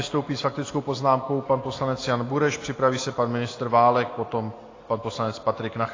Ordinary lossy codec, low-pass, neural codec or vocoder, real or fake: AAC, 32 kbps; 7.2 kHz; none; real